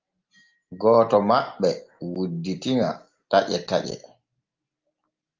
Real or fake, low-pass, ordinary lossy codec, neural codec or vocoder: real; 7.2 kHz; Opus, 32 kbps; none